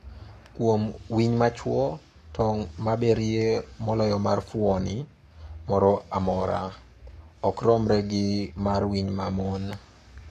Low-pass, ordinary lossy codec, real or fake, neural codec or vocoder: 14.4 kHz; MP3, 64 kbps; fake; codec, 44.1 kHz, 7.8 kbps, Pupu-Codec